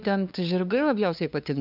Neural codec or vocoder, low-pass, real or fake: codec, 16 kHz, 2 kbps, FunCodec, trained on LibriTTS, 25 frames a second; 5.4 kHz; fake